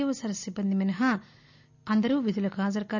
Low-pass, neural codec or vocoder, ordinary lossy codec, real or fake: 7.2 kHz; none; none; real